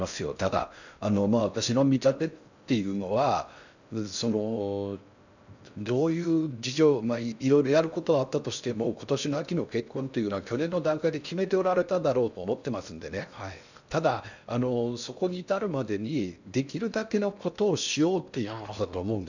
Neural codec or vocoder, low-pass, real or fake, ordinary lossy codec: codec, 16 kHz in and 24 kHz out, 0.6 kbps, FocalCodec, streaming, 4096 codes; 7.2 kHz; fake; none